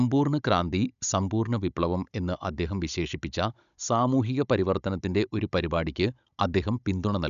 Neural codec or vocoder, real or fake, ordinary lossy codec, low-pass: codec, 16 kHz, 16 kbps, FunCodec, trained on Chinese and English, 50 frames a second; fake; none; 7.2 kHz